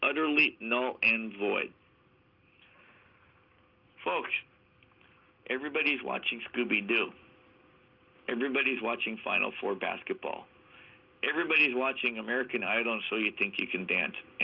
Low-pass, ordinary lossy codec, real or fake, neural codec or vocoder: 5.4 kHz; Opus, 24 kbps; real; none